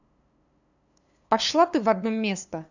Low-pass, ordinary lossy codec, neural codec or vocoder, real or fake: 7.2 kHz; none; codec, 16 kHz, 2 kbps, FunCodec, trained on LibriTTS, 25 frames a second; fake